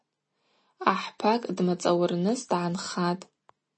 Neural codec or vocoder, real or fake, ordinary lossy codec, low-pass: none; real; MP3, 32 kbps; 9.9 kHz